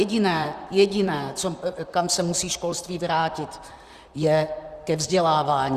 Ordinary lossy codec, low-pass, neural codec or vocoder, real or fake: Opus, 64 kbps; 14.4 kHz; vocoder, 44.1 kHz, 128 mel bands, Pupu-Vocoder; fake